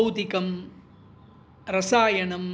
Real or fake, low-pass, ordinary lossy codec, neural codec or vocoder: real; none; none; none